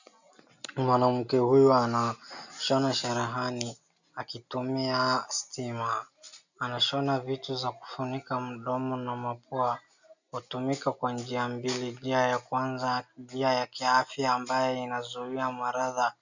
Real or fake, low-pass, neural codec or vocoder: real; 7.2 kHz; none